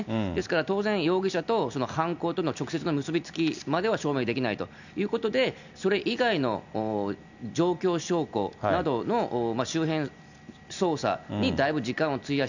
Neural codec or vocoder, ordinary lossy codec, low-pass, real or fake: none; none; 7.2 kHz; real